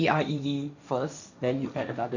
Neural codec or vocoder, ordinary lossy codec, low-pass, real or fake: codec, 16 kHz, 1.1 kbps, Voila-Tokenizer; none; 7.2 kHz; fake